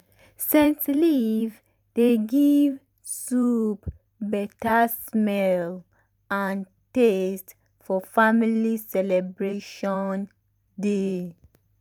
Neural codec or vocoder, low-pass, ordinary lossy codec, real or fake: vocoder, 44.1 kHz, 128 mel bands every 512 samples, BigVGAN v2; 19.8 kHz; none; fake